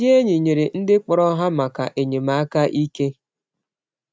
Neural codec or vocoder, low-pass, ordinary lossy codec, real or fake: none; none; none; real